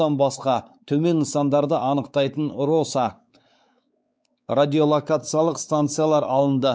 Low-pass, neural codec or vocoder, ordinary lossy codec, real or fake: none; codec, 16 kHz, 4 kbps, X-Codec, WavLM features, trained on Multilingual LibriSpeech; none; fake